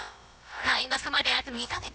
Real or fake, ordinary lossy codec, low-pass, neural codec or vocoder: fake; none; none; codec, 16 kHz, about 1 kbps, DyCAST, with the encoder's durations